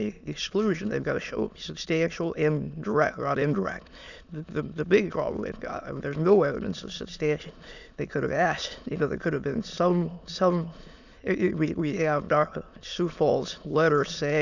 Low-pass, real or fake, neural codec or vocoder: 7.2 kHz; fake; autoencoder, 22.05 kHz, a latent of 192 numbers a frame, VITS, trained on many speakers